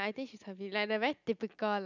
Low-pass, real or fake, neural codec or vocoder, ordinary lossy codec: 7.2 kHz; real; none; none